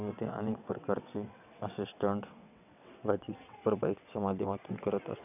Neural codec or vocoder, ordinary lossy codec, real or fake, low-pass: vocoder, 22.05 kHz, 80 mel bands, WaveNeXt; none; fake; 3.6 kHz